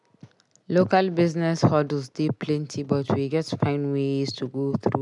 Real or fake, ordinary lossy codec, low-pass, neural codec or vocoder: real; none; 10.8 kHz; none